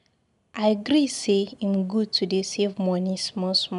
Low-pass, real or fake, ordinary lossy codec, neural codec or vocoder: 10.8 kHz; real; none; none